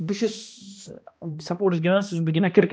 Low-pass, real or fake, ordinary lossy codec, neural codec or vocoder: none; fake; none; codec, 16 kHz, 1 kbps, X-Codec, HuBERT features, trained on balanced general audio